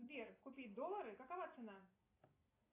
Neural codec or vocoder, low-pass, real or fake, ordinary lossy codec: none; 3.6 kHz; real; AAC, 24 kbps